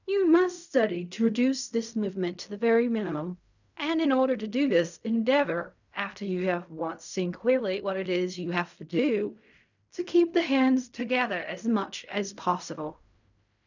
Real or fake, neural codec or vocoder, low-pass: fake; codec, 16 kHz in and 24 kHz out, 0.4 kbps, LongCat-Audio-Codec, fine tuned four codebook decoder; 7.2 kHz